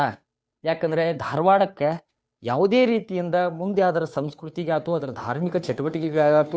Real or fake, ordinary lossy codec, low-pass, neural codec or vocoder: fake; none; none; codec, 16 kHz, 2 kbps, FunCodec, trained on Chinese and English, 25 frames a second